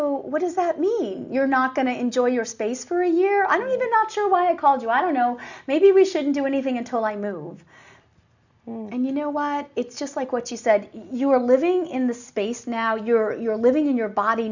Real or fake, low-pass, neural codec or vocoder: real; 7.2 kHz; none